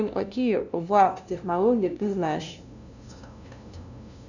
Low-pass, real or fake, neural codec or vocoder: 7.2 kHz; fake; codec, 16 kHz, 0.5 kbps, FunCodec, trained on LibriTTS, 25 frames a second